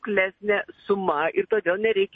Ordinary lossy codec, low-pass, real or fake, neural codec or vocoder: MP3, 32 kbps; 9.9 kHz; real; none